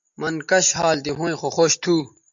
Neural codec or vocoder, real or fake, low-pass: none; real; 7.2 kHz